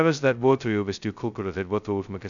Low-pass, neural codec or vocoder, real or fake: 7.2 kHz; codec, 16 kHz, 0.2 kbps, FocalCodec; fake